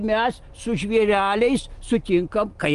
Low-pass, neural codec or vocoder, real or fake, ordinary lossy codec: 10.8 kHz; none; real; Opus, 24 kbps